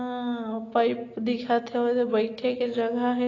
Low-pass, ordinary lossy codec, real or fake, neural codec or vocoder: 7.2 kHz; AAC, 32 kbps; real; none